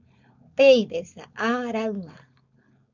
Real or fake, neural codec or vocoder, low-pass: fake; codec, 16 kHz, 4.8 kbps, FACodec; 7.2 kHz